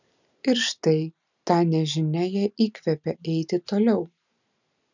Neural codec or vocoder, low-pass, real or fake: none; 7.2 kHz; real